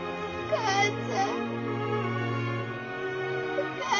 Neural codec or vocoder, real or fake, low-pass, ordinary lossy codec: none; real; 7.2 kHz; MP3, 64 kbps